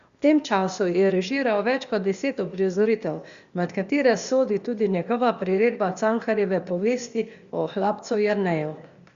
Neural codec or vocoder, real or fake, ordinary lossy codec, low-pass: codec, 16 kHz, 0.8 kbps, ZipCodec; fake; Opus, 64 kbps; 7.2 kHz